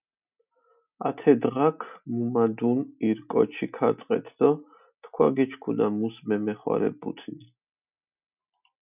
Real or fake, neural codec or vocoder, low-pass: real; none; 3.6 kHz